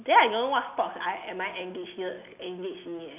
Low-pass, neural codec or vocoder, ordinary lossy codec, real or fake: 3.6 kHz; none; none; real